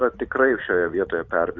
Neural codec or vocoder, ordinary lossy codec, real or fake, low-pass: vocoder, 44.1 kHz, 128 mel bands every 512 samples, BigVGAN v2; Opus, 64 kbps; fake; 7.2 kHz